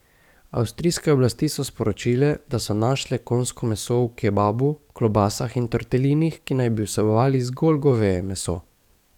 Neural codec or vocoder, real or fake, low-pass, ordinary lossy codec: codec, 44.1 kHz, 7.8 kbps, DAC; fake; 19.8 kHz; none